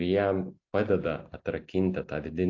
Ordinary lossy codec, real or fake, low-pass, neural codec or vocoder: AAC, 48 kbps; real; 7.2 kHz; none